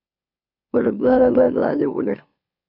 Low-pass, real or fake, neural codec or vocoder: 5.4 kHz; fake; autoencoder, 44.1 kHz, a latent of 192 numbers a frame, MeloTTS